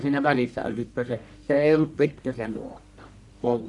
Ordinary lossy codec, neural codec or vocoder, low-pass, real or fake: none; codec, 44.1 kHz, 1.7 kbps, Pupu-Codec; 10.8 kHz; fake